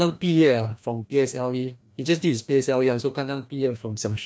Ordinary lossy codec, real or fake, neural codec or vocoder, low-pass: none; fake; codec, 16 kHz, 1 kbps, FreqCodec, larger model; none